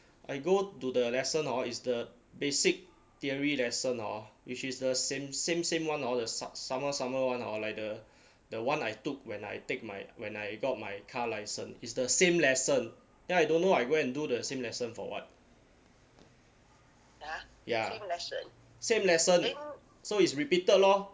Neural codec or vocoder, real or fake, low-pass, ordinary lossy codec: none; real; none; none